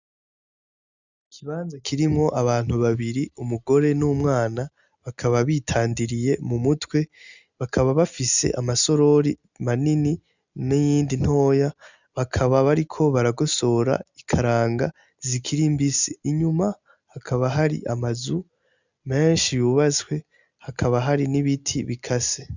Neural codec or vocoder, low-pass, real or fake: none; 7.2 kHz; real